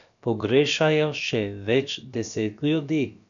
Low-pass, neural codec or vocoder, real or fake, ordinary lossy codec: 7.2 kHz; codec, 16 kHz, about 1 kbps, DyCAST, with the encoder's durations; fake; none